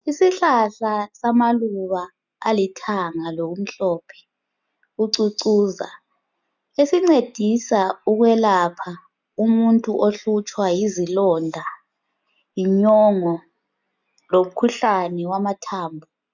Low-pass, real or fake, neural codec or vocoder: 7.2 kHz; real; none